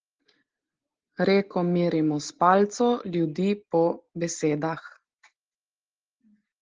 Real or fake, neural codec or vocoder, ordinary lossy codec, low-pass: real; none; Opus, 16 kbps; 7.2 kHz